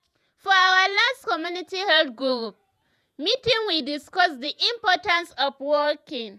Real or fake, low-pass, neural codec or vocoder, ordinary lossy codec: fake; 14.4 kHz; vocoder, 48 kHz, 128 mel bands, Vocos; none